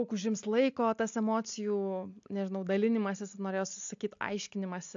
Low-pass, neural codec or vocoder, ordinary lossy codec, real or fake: 7.2 kHz; none; AAC, 64 kbps; real